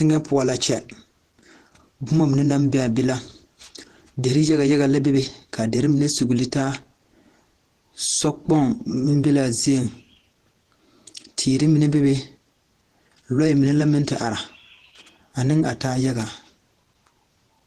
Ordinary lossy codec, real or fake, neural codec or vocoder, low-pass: Opus, 16 kbps; fake; vocoder, 48 kHz, 128 mel bands, Vocos; 14.4 kHz